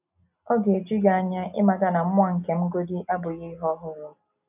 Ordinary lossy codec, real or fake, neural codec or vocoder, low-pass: none; real; none; 3.6 kHz